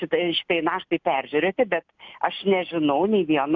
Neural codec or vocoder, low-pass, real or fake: none; 7.2 kHz; real